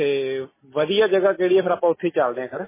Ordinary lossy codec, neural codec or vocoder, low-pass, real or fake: MP3, 16 kbps; none; 3.6 kHz; real